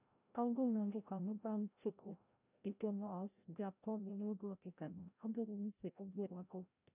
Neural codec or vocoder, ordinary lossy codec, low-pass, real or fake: codec, 16 kHz, 0.5 kbps, FreqCodec, larger model; none; 3.6 kHz; fake